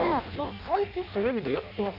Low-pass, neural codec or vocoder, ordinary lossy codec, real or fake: 5.4 kHz; codec, 16 kHz in and 24 kHz out, 0.6 kbps, FireRedTTS-2 codec; none; fake